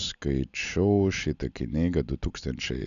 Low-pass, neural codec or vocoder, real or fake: 7.2 kHz; none; real